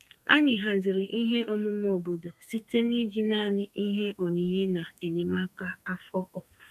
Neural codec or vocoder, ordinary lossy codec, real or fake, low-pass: codec, 32 kHz, 1.9 kbps, SNAC; none; fake; 14.4 kHz